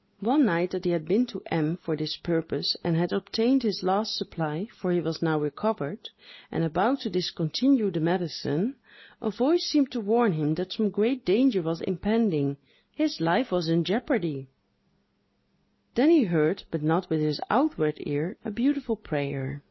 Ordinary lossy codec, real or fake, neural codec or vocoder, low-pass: MP3, 24 kbps; real; none; 7.2 kHz